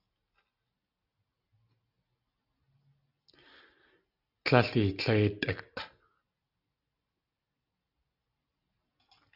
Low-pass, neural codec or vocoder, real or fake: 5.4 kHz; none; real